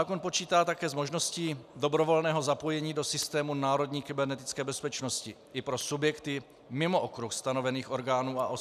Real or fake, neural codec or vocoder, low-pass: real; none; 14.4 kHz